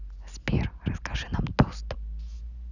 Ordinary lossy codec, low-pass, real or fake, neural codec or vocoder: none; 7.2 kHz; real; none